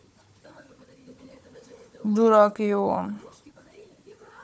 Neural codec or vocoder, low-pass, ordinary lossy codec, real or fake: codec, 16 kHz, 4 kbps, FunCodec, trained on Chinese and English, 50 frames a second; none; none; fake